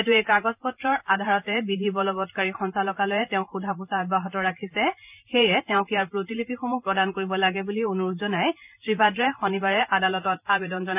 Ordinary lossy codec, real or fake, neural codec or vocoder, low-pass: none; fake; vocoder, 44.1 kHz, 128 mel bands every 512 samples, BigVGAN v2; 3.6 kHz